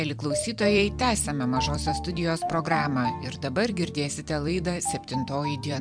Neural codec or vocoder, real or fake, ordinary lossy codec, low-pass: vocoder, 44.1 kHz, 128 mel bands every 256 samples, BigVGAN v2; fake; MP3, 96 kbps; 9.9 kHz